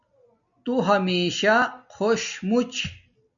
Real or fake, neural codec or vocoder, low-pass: real; none; 7.2 kHz